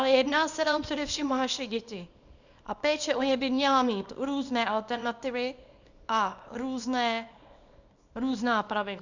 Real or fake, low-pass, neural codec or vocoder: fake; 7.2 kHz; codec, 24 kHz, 0.9 kbps, WavTokenizer, small release